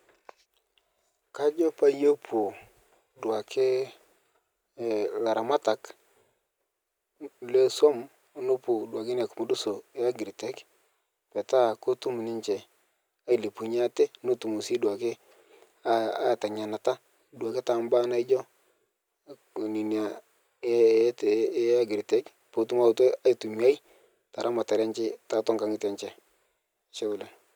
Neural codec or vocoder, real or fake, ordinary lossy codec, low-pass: none; real; none; none